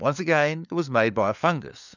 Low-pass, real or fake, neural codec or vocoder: 7.2 kHz; fake; codec, 16 kHz, 4 kbps, FunCodec, trained on LibriTTS, 50 frames a second